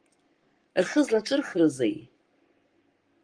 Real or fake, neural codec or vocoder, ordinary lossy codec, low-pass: fake; codec, 44.1 kHz, 7.8 kbps, Pupu-Codec; Opus, 24 kbps; 9.9 kHz